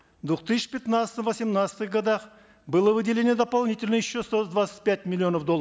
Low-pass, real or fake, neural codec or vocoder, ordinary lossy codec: none; real; none; none